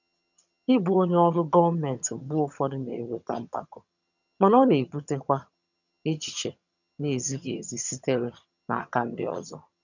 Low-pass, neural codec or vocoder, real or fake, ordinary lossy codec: 7.2 kHz; vocoder, 22.05 kHz, 80 mel bands, HiFi-GAN; fake; none